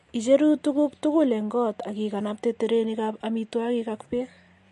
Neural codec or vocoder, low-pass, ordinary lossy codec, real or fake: none; 14.4 kHz; MP3, 48 kbps; real